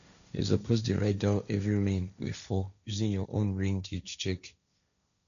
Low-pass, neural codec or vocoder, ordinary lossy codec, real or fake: 7.2 kHz; codec, 16 kHz, 1.1 kbps, Voila-Tokenizer; none; fake